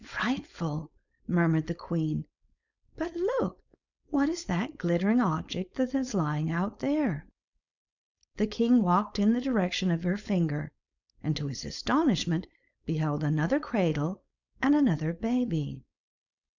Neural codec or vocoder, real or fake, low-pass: codec, 16 kHz, 4.8 kbps, FACodec; fake; 7.2 kHz